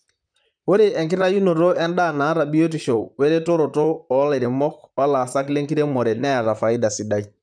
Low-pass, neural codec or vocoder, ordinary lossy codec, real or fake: 9.9 kHz; vocoder, 44.1 kHz, 128 mel bands, Pupu-Vocoder; none; fake